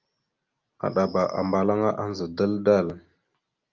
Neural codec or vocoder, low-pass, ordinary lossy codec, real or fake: none; 7.2 kHz; Opus, 24 kbps; real